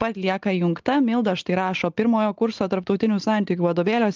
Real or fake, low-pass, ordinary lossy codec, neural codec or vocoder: real; 7.2 kHz; Opus, 32 kbps; none